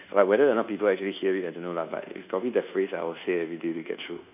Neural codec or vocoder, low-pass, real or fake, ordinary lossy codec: codec, 24 kHz, 1.2 kbps, DualCodec; 3.6 kHz; fake; none